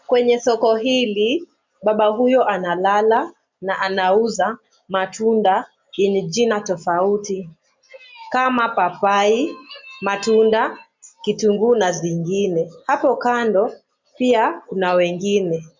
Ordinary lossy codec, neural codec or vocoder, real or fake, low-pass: MP3, 64 kbps; none; real; 7.2 kHz